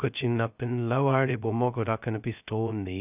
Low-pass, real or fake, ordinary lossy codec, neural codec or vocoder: 3.6 kHz; fake; none; codec, 16 kHz, 0.2 kbps, FocalCodec